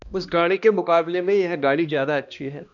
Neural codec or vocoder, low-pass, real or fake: codec, 16 kHz, 1 kbps, X-Codec, HuBERT features, trained on balanced general audio; 7.2 kHz; fake